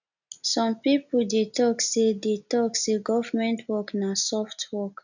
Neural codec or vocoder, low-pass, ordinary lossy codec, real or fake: none; 7.2 kHz; none; real